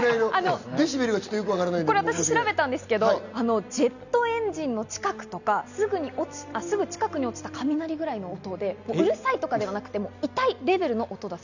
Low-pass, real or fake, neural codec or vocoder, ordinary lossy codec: 7.2 kHz; real; none; none